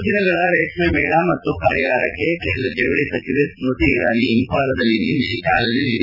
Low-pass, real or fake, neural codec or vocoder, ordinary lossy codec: 5.4 kHz; fake; vocoder, 44.1 kHz, 80 mel bands, Vocos; none